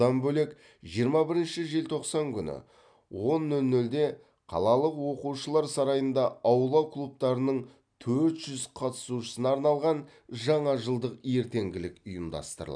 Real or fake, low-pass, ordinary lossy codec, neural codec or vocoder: real; 9.9 kHz; none; none